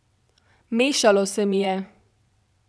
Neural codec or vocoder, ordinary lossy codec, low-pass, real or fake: vocoder, 22.05 kHz, 80 mel bands, WaveNeXt; none; none; fake